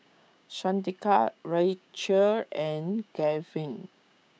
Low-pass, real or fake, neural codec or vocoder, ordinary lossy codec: none; fake; codec, 16 kHz, 6 kbps, DAC; none